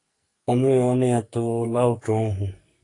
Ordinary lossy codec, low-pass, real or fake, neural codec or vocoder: AAC, 48 kbps; 10.8 kHz; fake; codec, 44.1 kHz, 2.6 kbps, SNAC